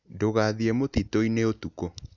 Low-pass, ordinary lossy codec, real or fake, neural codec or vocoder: 7.2 kHz; none; real; none